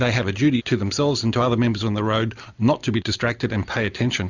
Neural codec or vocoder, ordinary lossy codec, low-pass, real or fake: none; Opus, 64 kbps; 7.2 kHz; real